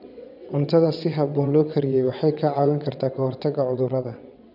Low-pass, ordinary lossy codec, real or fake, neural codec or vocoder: 5.4 kHz; none; fake; vocoder, 22.05 kHz, 80 mel bands, WaveNeXt